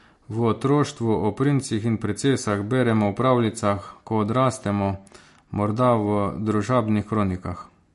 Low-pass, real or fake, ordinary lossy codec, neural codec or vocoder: 14.4 kHz; real; MP3, 48 kbps; none